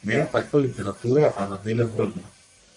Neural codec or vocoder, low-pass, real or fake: codec, 44.1 kHz, 1.7 kbps, Pupu-Codec; 10.8 kHz; fake